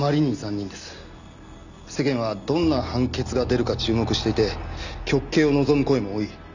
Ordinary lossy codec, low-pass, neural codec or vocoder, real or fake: none; 7.2 kHz; none; real